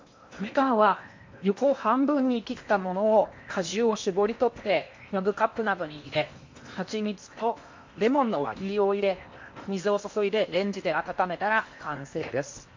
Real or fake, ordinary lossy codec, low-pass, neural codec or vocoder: fake; MP3, 48 kbps; 7.2 kHz; codec, 16 kHz in and 24 kHz out, 0.8 kbps, FocalCodec, streaming, 65536 codes